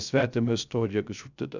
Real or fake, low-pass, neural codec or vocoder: fake; 7.2 kHz; codec, 16 kHz, 0.3 kbps, FocalCodec